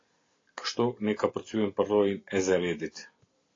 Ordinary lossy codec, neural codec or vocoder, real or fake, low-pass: AAC, 32 kbps; none; real; 7.2 kHz